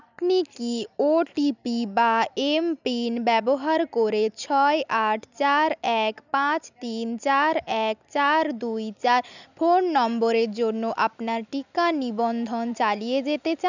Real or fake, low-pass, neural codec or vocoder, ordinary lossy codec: real; 7.2 kHz; none; none